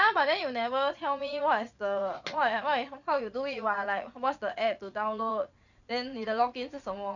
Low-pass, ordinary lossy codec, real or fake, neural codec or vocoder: 7.2 kHz; none; fake; vocoder, 22.05 kHz, 80 mel bands, Vocos